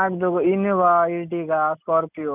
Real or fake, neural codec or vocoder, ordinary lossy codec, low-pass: real; none; none; 3.6 kHz